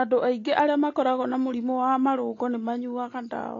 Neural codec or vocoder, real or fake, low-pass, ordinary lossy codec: none; real; 7.2 kHz; AAC, 32 kbps